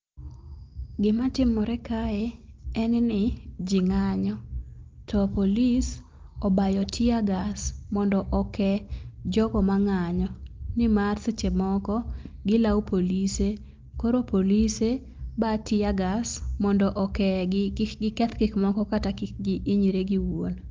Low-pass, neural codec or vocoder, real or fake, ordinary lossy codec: 7.2 kHz; none; real; Opus, 24 kbps